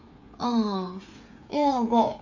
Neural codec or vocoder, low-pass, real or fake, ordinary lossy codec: codec, 16 kHz, 8 kbps, FreqCodec, smaller model; 7.2 kHz; fake; none